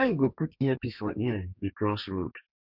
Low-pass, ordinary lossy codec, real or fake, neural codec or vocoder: 5.4 kHz; MP3, 48 kbps; fake; codec, 32 kHz, 1.9 kbps, SNAC